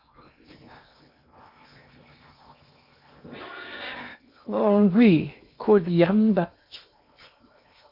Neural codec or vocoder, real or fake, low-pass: codec, 16 kHz in and 24 kHz out, 0.8 kbps, FocalCodec, streaming, 65536 codes; fake; 5.4 kHz